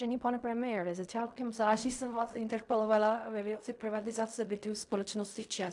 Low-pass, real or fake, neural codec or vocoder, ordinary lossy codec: 10.8 kHz; fake; codec, 16 kHz in and 24 kHz out, 0.4 kbps, LongCat-Audio-Codec, fine tuned four codebook decoder; AAC, 64 kbps